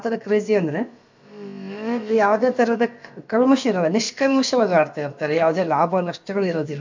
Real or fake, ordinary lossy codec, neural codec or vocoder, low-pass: fake; MP3, 48 kbps; codec, 16 kHz, about 1 kbps, DyCAST, with the encoder's durations; 7.2 kHz